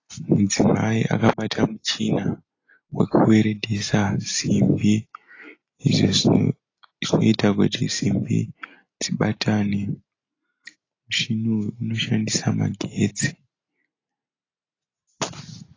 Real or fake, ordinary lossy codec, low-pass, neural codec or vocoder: real; AAC, 32 kbps; 7.2 kHz; none